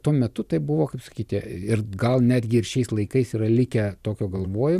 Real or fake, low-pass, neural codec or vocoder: fake; 14.4 kHz; vocoder, 44.1 kHz, 128 mel bands every 512 samples, BigVGAN v2